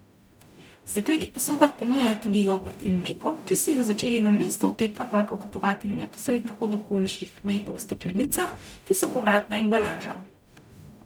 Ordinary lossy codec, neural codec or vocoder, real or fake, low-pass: none; codec, 44.1 kHz, 0.9 kbps, DAC; fake; none